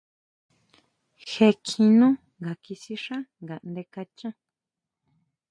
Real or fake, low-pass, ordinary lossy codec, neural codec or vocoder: real; 9.9 kHz; AAC, 64 kbps; none